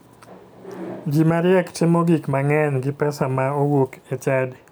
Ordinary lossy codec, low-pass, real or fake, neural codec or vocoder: none; none; real; none